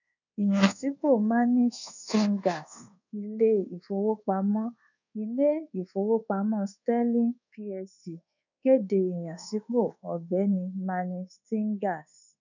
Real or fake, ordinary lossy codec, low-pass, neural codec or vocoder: fake; none; 7.2 kHz; codec, 24 kHz, 1.2 kbps, DualCodec